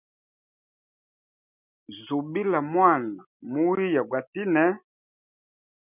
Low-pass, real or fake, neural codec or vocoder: 3.6 kHz; real; none